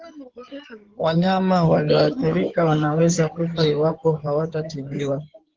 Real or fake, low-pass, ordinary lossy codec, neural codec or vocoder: fake; 7.2 kHz; Opus, 16 kbps; codec, 16 kHz in and 24 kHz out, 2.2 kbps, FireRedTTS-2 codec